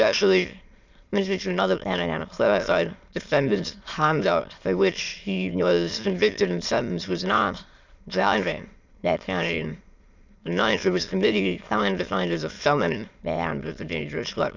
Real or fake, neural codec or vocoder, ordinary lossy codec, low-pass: fake; autoencoder, 22.05 kHz, a latent of 192 numbers a frame, VITS, trained on many speakers; Opus, 64 kbps; 7.2 kHz